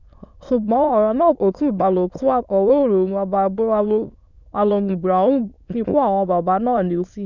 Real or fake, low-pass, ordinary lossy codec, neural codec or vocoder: fake; 7.2 kHz; none; autoencoder, 22.05 kHz, a latent of 192 numbers a frame, VITS, trained on many speakers